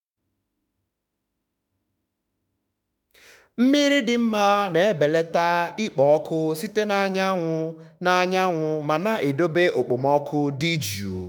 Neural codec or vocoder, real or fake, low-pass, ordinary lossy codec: autoencoder, 48 kHz, 32 numbers a frame, DAC-VAE, trained on Japanese speech; fake; 19.8 kHz; none